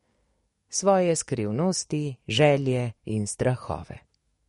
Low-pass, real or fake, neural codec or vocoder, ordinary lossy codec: 19.8 kHz; fake; codec, 44.1 kHz, 7.8 kbps, DAC; MP3, 48 kbps